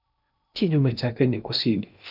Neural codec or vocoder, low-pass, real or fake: codec, 16 kHz in and 24 kHz out, 0.6 kbps, FocalCodec, streaming, 2048 codes; 5.4 kHz; fake